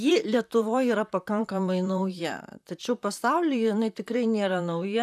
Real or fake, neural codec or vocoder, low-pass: fake; vocoder, 44.1 kHz, 128 mel bands, Pupu-Vocoder; 14.4 kHz